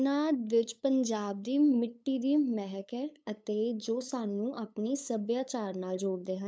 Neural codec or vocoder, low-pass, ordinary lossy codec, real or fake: codec, 16 kHz, 8 kbps, FunCodec, trained on LibriTTS, 25 frames a second; none; none; fake